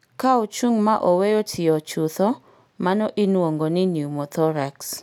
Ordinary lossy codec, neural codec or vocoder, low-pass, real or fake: none; none; none; real